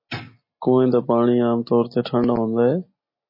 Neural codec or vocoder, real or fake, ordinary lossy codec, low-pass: none; real; MP3, 24 kbps; 5.4 kHz